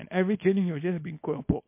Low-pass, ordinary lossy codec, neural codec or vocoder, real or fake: 3.6 kHz; MP3, 24 kbps; codec, 24 kHz, 0.9 kbps, WavTokenizer, small release; fake